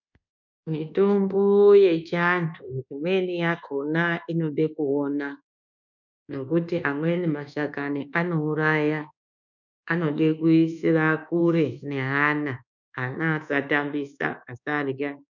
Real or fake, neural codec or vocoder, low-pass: fake; codec, 24 kHz, 1.2 kbps, DualCodec; 7.2 kHz